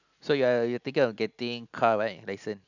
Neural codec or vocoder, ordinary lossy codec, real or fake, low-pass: none; none; real; 7.2 kHz